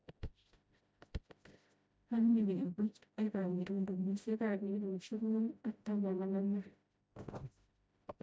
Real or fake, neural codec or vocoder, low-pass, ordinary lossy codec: fake; codec, 16 kHz, 0.5 kbps, FreqCodec, smaller model; none; none